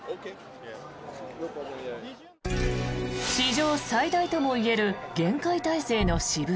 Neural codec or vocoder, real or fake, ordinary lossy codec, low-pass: none; real; none; none